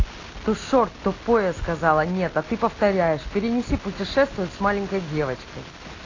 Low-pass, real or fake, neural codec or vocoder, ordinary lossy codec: 7.2 kHz; real; none; AAC, 32 kbps